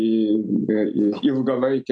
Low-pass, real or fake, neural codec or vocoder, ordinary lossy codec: 9.9 kHz; real; none; AAC, 64 kbps